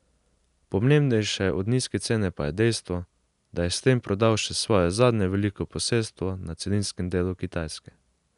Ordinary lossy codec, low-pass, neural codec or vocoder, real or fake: none; 10.8 kHz; none; real